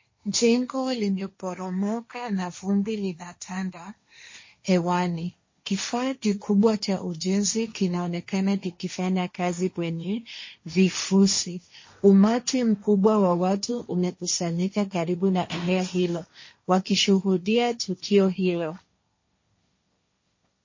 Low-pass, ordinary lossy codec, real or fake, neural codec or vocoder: 7.2 kHz; MP3, 32 kbps; fake; codec, 16 kHz, 1.1 kbps, Voila-Tokenizer